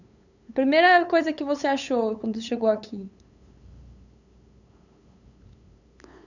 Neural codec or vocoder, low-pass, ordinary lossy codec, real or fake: codec, 16 kHz, 8 kbps, FunCodec, trained on LibriTTS, 25 frames a second; 7.2 kHz; none; fake